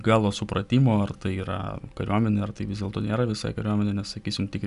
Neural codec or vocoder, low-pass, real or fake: none; 10.8 kHz; real